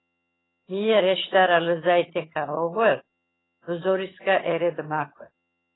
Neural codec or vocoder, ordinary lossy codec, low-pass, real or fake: vocoder, 22.05 kHz, 80 mel bands, HiFi-GAN; AAC, 16 kbps; 7.2 kHz; fake